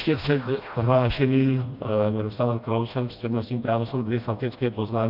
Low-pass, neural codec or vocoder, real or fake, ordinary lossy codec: 5.4 kHz; codec, 16 kHz, 1 kbps, FreqCodec, smaller model; fake; AAC, 48 kbps